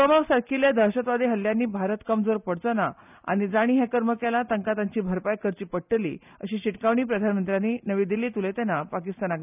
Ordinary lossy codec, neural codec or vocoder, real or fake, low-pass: none; none; real; 3.6 kHz